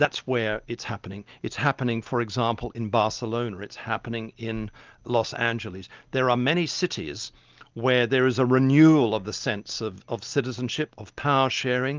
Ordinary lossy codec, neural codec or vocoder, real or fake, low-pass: Opus, 32 kbps; none; real; 7.2 kHz